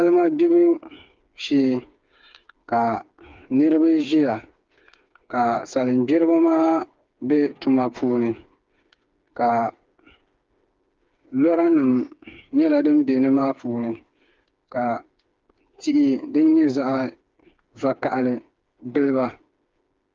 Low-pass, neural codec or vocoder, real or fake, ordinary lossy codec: 7.2 kHz; codec, 16 kHz, 4 kbps, FreqCodec, smaller model; fake; Opus, 32 kbps